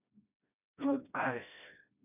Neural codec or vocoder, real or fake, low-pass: codec, 16 kHz, 1 kbps, FreqCodec, smaller model; fake; 3.6 kHz